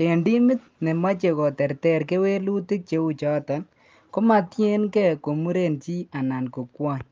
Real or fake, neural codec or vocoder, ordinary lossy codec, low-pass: real; none; Opus, 24 kbps; 7.2 kHz